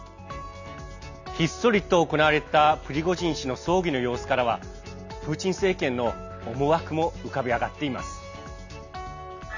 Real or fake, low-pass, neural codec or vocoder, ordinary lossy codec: real; 7.2 kHz; none; none